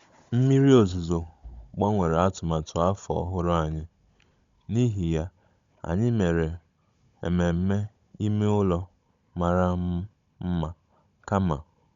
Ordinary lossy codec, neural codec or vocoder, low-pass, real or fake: Opus, 64 kbps; codec, 16 kHz, 16 kbps, FunCodec, trained on Chinese and English, 50 frames a second; 7.2 kHz; fake